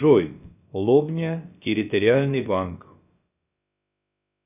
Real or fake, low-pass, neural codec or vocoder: fake; 3.6 kHz; codec, 16 kHz, about 1 kbps, DyCAST, with the encoder's durations